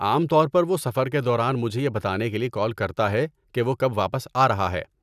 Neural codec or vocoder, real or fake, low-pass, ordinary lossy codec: none; real; 14.4 kHz; none